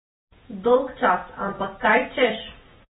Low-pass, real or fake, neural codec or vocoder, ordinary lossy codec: 19.8 kHz; fake; vocoder, 48 kHz, 128 mel bands, Vocos; AAC, 16 kbps